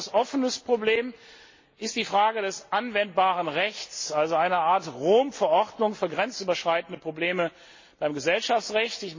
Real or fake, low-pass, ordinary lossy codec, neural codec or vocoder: real; 7.2 kHz; MP3, 32 kbps; none